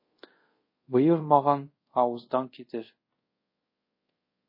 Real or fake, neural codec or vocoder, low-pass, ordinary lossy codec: fake; codec, 24 kHz, 0.5 kbps, DualCodec; 5.4 kHz; MP3, 24 kbps